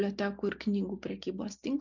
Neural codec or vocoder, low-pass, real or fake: none; 7.2 kHz; real